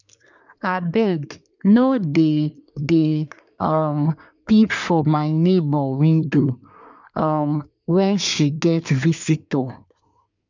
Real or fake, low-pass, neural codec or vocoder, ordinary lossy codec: fake; 7.2 kHz; codec, 24 kHz, 1 kbps, SNAC; none